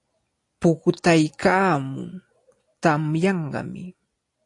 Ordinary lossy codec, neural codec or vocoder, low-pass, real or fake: AAC, 48 kbps; none; 10.8 kHz; real